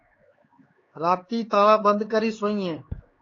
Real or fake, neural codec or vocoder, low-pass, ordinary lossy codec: fake; codec, 16 kHz, 4 kbps, X-Codec, HuBERT features, trained on LibriSpeech; 7.2 kHz; AAC, 32 kbps